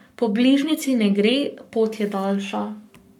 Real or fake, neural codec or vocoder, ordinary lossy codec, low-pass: fake; codec, 44.1 kHz, 7.8 kbps, Pupu-Codec; MP3, 96 kbps; 19.8 kHz